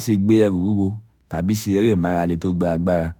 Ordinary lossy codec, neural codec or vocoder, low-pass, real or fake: none; autoencoder, 48 kHz, 32 numbers a frame, DAC-VAE, trained on Japanese speech; none; fake